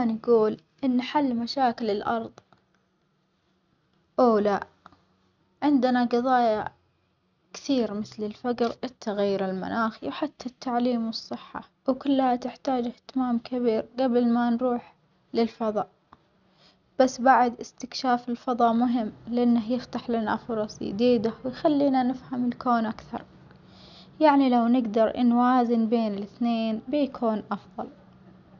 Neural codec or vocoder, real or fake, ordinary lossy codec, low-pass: none; real; none; 7.2 kHz